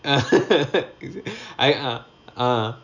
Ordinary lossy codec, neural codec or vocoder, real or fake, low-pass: none; none; real; 7.2 kHz